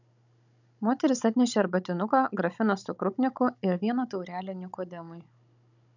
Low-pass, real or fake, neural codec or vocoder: 7.2 kHz; fake; codec, 16 kHz, 16 kbps, FunCodec, trained on Chinese and English, 50 frames a second